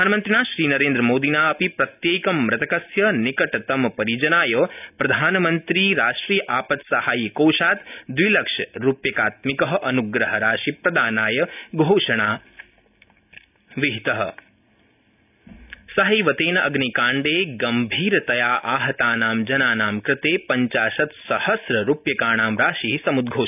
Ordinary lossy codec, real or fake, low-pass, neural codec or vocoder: none; real; 3.6 kHz; none